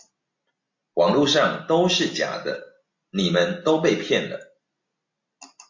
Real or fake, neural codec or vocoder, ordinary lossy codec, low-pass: real; none; MP3, 48 kbps; 7.2 kHz